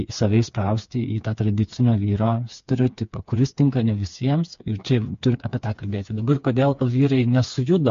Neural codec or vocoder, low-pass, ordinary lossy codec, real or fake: codec, 16 kHz, 4 kbps, FreqCodec, smaller model; 7.2 kHz; MP3, 48 kbps; fake